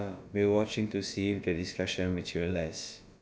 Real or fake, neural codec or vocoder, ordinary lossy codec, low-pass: fake; codec, 16 kHz, about 1 kbps, DyCAST, with the encoder's durations; none; none